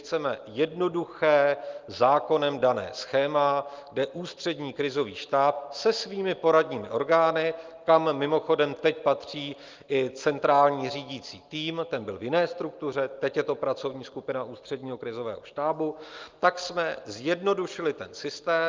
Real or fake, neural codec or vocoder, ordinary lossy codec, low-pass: real; none; Opus, 32 kbps; 7.2 kHz